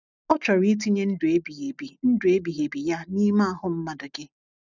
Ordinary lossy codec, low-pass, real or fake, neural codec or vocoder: none; 7.2 kHz; real; none